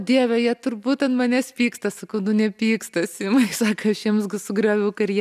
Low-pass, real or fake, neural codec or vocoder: 14.4 kHz; real; none